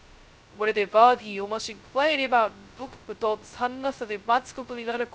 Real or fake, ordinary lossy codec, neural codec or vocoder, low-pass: fake; none; codec, 16 kHz, 0.2 kbps, FocalCodec; none